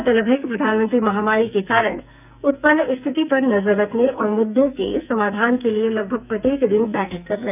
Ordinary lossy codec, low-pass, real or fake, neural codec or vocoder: none; 3.6 kHz; fake; codec, 44.1 kHz, 2.6 kbps, SNAC